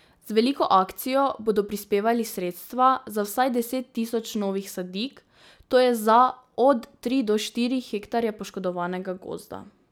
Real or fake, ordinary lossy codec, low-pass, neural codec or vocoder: real; none; none; none